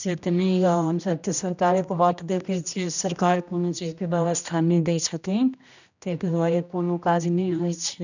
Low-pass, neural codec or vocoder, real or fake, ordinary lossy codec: 7.2 kHz; codec, 16 kHz, 1 kbps, X-Codec, HuBERT features, trained on general audio; fake; none